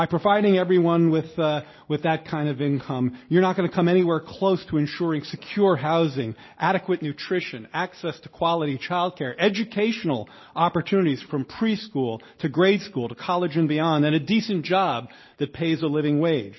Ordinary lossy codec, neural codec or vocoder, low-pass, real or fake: MP3, 24 kbps; none; 7.2 kHz; real